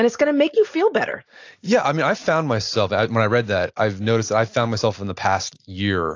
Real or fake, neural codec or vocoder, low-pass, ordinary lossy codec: real; none; 7.2 kHz; AAC, 48 kbps